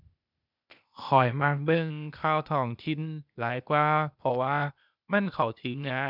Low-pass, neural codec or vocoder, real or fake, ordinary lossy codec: 5.4 kHz; codec, 16 kHz, 0.8 kbps, ZipCodec; fake; none